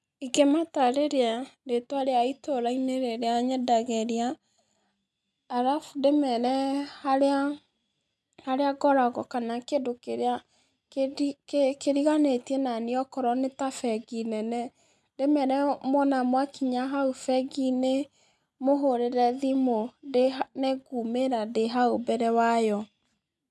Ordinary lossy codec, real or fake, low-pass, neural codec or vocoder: none; real; none; none